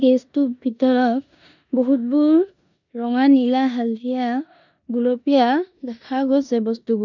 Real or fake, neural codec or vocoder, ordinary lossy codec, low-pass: fake; codec, 16 kHz in and 24 kHz out, 0.9 kbps, LongCat-Audio-Codec, four codebook decoder; none; 7.2 kHz